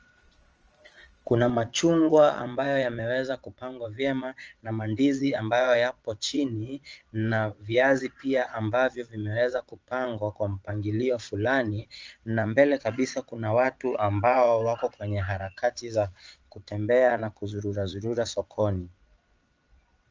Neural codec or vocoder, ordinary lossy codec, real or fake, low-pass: vocoder, 22.05 kHz, 80 mel bands, Vocos; Opus, 24 kbps; fake; 7.2 kHz